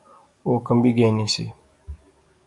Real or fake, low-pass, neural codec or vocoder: fake; 10.8 kHz; codec, 44.1 kHz, 7.8 kbps, DAC